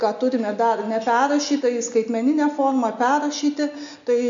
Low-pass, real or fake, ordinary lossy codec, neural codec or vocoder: 7.2 kHz; real; AAC, 64 kbps; none